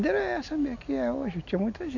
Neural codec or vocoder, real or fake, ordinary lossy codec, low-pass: none; real; none; 7.2 kHz